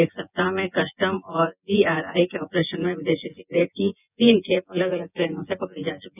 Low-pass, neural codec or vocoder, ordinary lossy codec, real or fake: 3.6 kHz; vocoder, 24 kHz, 100 mel bands, Vocos; none; fake